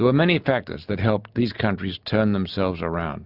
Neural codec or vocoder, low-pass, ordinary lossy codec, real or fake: none; 5.4 kHz; Opus, 64 kbps; real